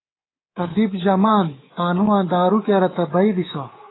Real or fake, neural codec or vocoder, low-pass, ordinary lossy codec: fake; codec, 24 kHz, 3.1 kbps, DualCodec; 7.2 kHz; AAC, 16 kbps